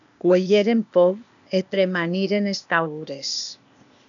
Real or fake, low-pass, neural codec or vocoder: fake; 7.2 kHz; codec, 16 kHz, 0.8 kbps, ZipCodec